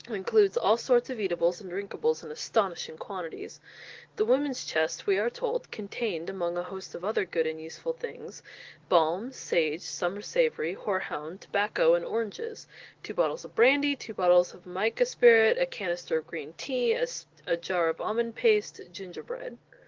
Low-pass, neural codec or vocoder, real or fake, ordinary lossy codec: 7.2 kHz; none; real; Opus, 16 kbps